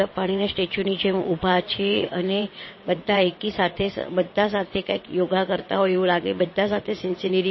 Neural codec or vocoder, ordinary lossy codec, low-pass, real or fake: vocoder, 22.05 kHz, 80 mel bands, WaveNeXt; MP3, 24 kbps; 7.2 kHz; fake